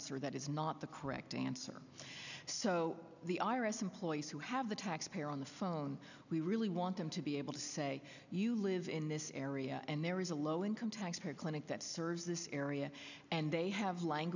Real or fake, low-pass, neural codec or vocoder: real; 7.2 kHz; none